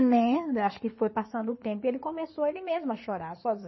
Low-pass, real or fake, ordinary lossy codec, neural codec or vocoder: 7.2 kHz; fake; MP3, 24 kbps; codec, 16 kHz in and 24 kHz out, 2.2 kbps, FireRedTTS-2 codec